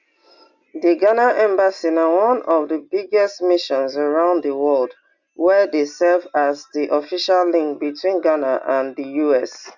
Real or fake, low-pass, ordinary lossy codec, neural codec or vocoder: real; 7.2 kHz; Opus, 64 kbps; none